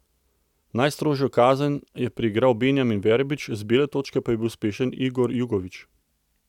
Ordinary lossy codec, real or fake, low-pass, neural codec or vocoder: none; real; 19.8 kHz; none